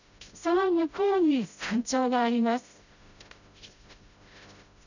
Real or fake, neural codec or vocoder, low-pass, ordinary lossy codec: fake; codec, 16 kHz, 0.5 kbps, FreqCodec, smaller model; 7.2 kHz; none